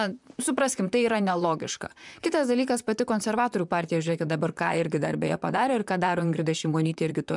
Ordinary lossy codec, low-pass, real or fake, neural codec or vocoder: MP3, 96 kbps; 10.8 kHz; fake; vocoder, 44.1 kHz, 128 mel bands, Pupu-Vocoder